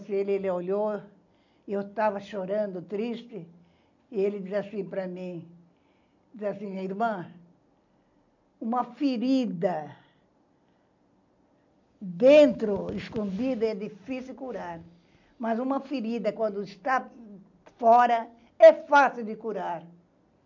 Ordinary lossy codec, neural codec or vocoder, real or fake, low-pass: none; none; real; 7.2 kHz